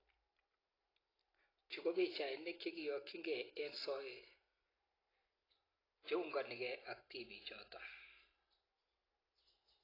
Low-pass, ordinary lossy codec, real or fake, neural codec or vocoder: 5.4 kHz; AAC, 24 kbps; real; none